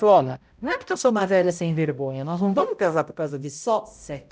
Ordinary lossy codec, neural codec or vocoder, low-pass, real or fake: none; codec, 16 kHz, 0.5 kbps, X-Codec, HuBERT features, trained on balanced general audio; none; fake